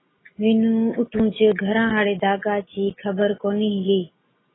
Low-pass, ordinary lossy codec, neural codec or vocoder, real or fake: 7.2 kHz; AAC, 16 kbps; none; real